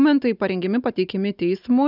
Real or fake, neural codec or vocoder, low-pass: real; none; 5.4 kHz